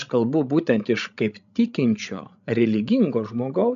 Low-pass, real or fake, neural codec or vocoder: 7.2 kHz; fake; codec, 16 kHz, 16 kbps, FreqCodec, larger model